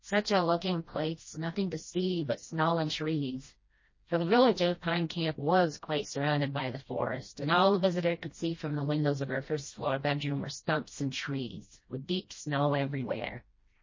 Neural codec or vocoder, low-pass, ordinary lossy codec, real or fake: codec, 16 kHz, 1 kbps, FreqCodec, smaller model; 7.2 kHz; MP3, 32 kbps; fake